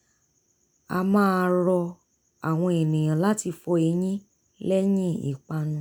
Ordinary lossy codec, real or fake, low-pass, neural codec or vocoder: none; real; none; none